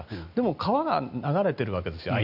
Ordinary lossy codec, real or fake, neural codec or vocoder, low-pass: none; real; none; 5.4 kHz